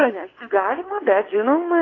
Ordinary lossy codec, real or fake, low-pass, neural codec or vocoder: AAC, 32 kbps; fake; 7.2 kHz; vocoder, 22.05 kHz, 80 mel bands, WaveNeXt